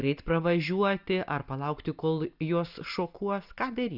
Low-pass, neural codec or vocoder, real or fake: 5.4 kHz; none; real